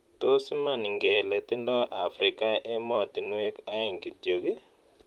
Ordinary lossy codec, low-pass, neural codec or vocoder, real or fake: Opus, 32 kbps; 19.8 kHz; vocoder, 44.1 kHz, 128 mel bands, Pupu-Vocoder; fake